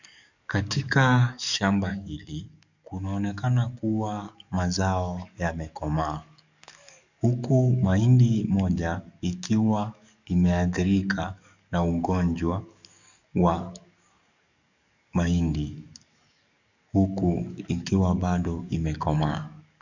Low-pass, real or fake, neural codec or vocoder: 7.2 kHz; fake; codec, 44.1 kHz, 7.8 kbps, DAC